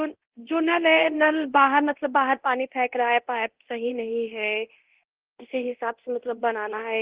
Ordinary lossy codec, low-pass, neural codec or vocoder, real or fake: Opus, 16 kbps; 3.6 kHz; codec, 24 kHz, 0.9 kbps, DualCodec; fake